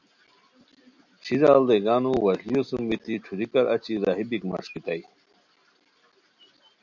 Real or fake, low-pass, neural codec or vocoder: real; 7.2 kHz; none